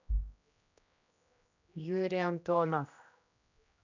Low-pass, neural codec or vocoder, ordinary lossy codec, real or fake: 7.2 kHz; codec, 16 kHz, 1 kbps, X-Codec, HuBERT features, trained on general audio; none; fake